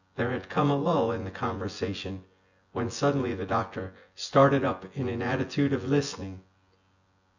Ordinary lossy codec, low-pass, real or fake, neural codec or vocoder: Opus, 64 kbps; 7.2 kHz; fake; vocoder, 24 kHz, 100 mel bands, Vocos